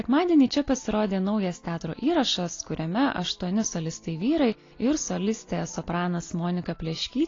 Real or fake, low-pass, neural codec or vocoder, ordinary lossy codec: real; 7.2 kHz; none; AAC, 32 kbps